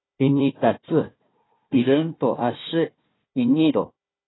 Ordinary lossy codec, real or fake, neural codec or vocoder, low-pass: AAC, 16 kbps; fake; codec, 16 kHz, 1 kbps, FunCodec, trained on Chinese and English, 50 frames a second; 7.2 kHz